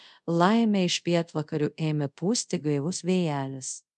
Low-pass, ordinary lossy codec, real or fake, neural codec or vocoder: 10.8 kHz; MP3, 96 kbps; fake; codec, 24 kHz, 0.5 kbps, DualCodec